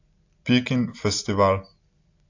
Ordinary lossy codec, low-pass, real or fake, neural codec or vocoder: AAC, 48 kbps; 7.2 kHz; real; none